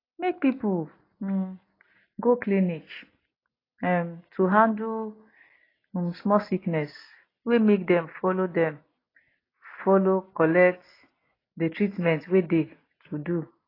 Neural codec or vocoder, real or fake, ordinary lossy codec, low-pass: none; real; AAC, 24 kbps; 5.4 kHz